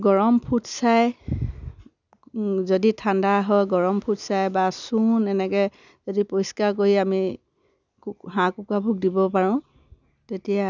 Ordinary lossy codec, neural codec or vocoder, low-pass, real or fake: none; none; 7.2 kHz; real